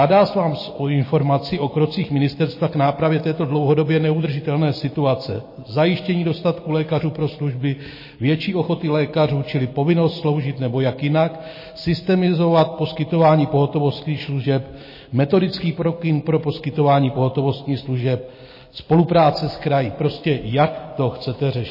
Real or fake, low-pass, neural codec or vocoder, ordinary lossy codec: real; 5.4 kHz; none; MP3, 24 kbps